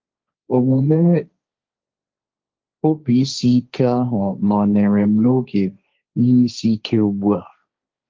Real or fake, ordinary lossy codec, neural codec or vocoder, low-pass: fake; Opus, 24 kbps; codec, 16 kHz, 1.1 kbps, Voila-Tokenizer; 7.2 kHz